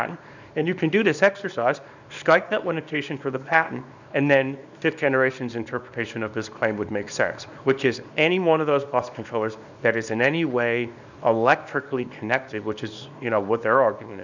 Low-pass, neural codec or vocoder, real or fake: 7.2 kHz; codec, 24 kHz, 0.9 kbps, WavTokenizer, small release; fake